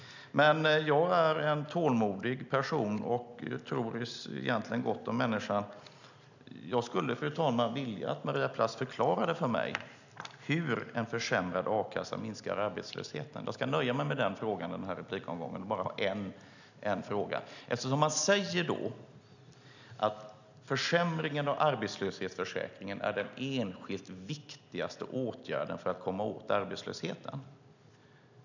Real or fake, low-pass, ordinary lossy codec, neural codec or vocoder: real; 7.2 kHz; none; none